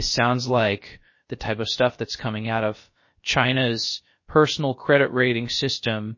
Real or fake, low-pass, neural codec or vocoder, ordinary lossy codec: fake; 7.2 kHz; codec, 16 kHz, 0.3 kbps, FocalCodec; MP3, 32 kbps